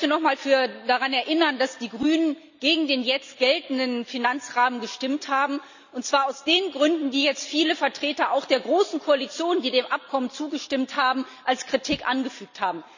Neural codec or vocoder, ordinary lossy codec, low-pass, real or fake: none; none; 7.2 kHz; real